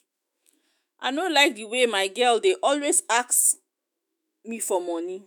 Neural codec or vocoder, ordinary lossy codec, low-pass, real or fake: autoencoder, 48 kHz, 128 numbers a frame, DAC-VAE, trained on Japanese speech; none; none; fake